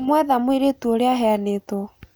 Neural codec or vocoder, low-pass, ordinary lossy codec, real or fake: none; none; none; real